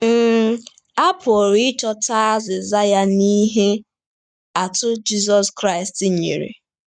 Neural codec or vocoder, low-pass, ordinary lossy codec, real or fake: codec, 44.1 kHz, 7.8 kbps, Pupu-Codec; 9.9 kHz; none; fake